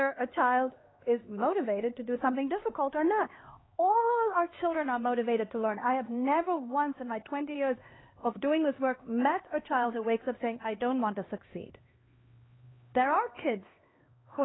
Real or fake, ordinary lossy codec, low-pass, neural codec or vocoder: fake; AAC, 16 kbps; 7.2 kHz; codec, 16 kHz, 2 kbps, X-Codec, HuBERT features, trained on LibriSpeech